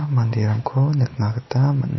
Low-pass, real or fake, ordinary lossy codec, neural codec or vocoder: 7.2 kHz; real; MP3, 24 kbps; none